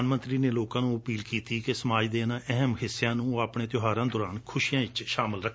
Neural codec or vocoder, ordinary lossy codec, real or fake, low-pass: none; none; real; none